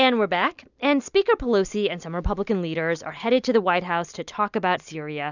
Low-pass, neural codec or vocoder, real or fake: 7.2 kHz; none; real